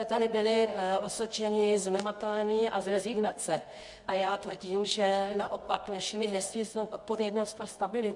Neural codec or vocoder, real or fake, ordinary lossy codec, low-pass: codec, 24 kHz, 0.9 kbps, WavTokenizer, medium music audio release; fake; MP3, 64 kbps; 10.8 kHz